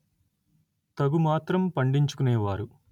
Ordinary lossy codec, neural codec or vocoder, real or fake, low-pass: none; none; real; 19.8 kHz